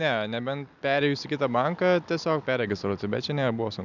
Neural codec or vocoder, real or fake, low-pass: none; real; 7.2 kHz